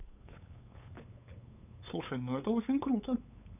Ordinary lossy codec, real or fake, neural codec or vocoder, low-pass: none; fake; codec, 16 kHz, 8 kbps, FunCodec, trained on LibriTTS, 25 frames a second; 3.6 kHz